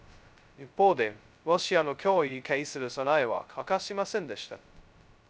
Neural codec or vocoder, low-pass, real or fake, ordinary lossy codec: codec, 16 kHz, 0.2 kbps, FocalCodec; none; fake; none